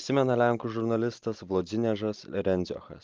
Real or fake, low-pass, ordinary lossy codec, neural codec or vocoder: real; 7.2 kHz; Opus, 32 kbps; none